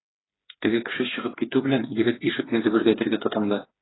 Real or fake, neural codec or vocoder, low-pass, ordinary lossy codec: fake; codec, 16 kHz, 4 kbps, FreqCodec, smaller model; 7.2 kHz; AAC, 16 kbps